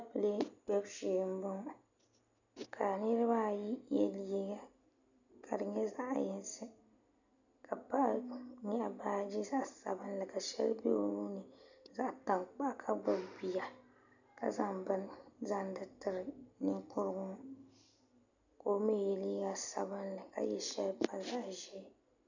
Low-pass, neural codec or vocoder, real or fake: 7.2 kHz; none; real